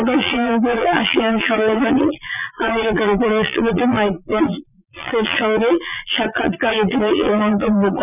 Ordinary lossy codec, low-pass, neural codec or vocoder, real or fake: none; 3.6 kHz; vocoder, 22.05 kHz, 80 mel bands, Vocos; fake